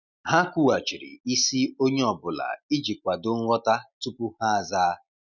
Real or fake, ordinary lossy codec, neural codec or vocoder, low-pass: real; none; none; 7.2 kHz